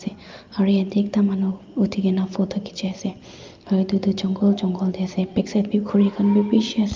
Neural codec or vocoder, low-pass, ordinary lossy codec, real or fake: none; 7.2 kHz; Opus, 24 kbps; real